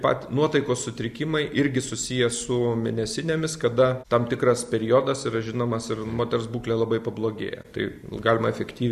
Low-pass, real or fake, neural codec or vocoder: 14.4 kHz; real; none